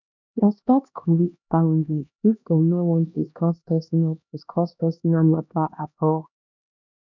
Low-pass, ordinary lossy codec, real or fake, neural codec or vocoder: 7.2 kHz; none; fake; codec, 16 kHz, 1 kbps, X-Codec, HuBERT features, trained on LibriSpeech